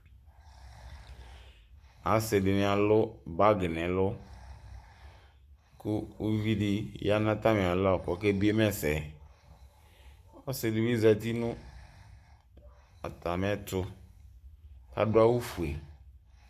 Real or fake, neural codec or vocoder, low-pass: fake; codec, 44.1 kHz, 7.8 kbps, Pupu-Codec; 14.4 kHz